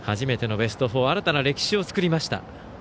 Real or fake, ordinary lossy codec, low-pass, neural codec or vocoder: real; none; none; none